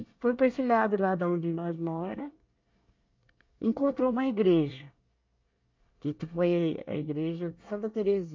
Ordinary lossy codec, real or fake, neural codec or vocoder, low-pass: MP3, 48 kbps; fake; codec, 24 kHz, 1 kbps, SNAC; 7.2 kHz